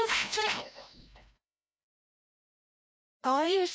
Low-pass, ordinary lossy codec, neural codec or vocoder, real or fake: none; none; codec, 16 kHz, 0.5 kbps, FreqCodec, larger model; fake